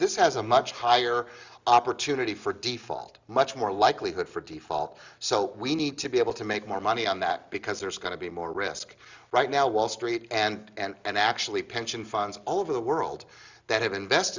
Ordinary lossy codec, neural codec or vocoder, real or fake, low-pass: Opus, 64 kbps; none; real; 7.2 kHz